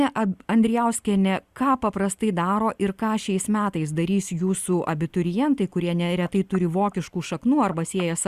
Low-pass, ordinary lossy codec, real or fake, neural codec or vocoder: 14.4 kHz; Opus, 64 kbps; real; none